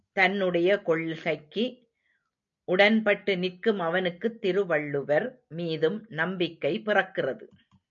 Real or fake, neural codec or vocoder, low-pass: real; none; 7.2 kHz